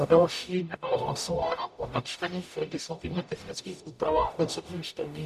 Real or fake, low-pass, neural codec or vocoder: fake; 14.4 kHz; codec, 44.1 kHz, 0.9 kbps, DAC